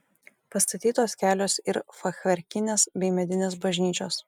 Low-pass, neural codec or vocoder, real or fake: 19.8 kHz; none; real